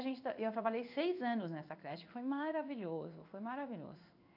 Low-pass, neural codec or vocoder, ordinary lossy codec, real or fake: 5.4 kHz; codec, 16 kHz in and 24 kHz out, 1 kbps, XY-Tokenizer; AAC, 48 kbps; fake